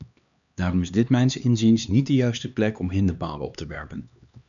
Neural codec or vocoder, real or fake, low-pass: codec, 16 kHz, 2 kbps, X-Codec, HuBERT features, trained on LibriSpeech; fake; 7.2 kHz